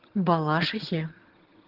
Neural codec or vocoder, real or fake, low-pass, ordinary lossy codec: vocoder, 22.05 kHz, 80 mel bands, HiFi-GAN; fake; 5.4 kHz; Opus, 16 kbps